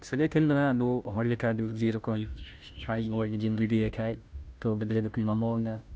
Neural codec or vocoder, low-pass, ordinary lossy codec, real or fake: codec, 16 kHz, 0.5 kbps, FunCodec, trained on Chinese and English, 25 frames a second; none; none; fake